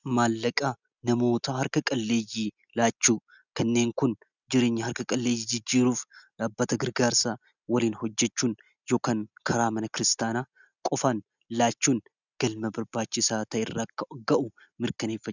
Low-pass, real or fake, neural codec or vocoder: 7.2 kHz; real; none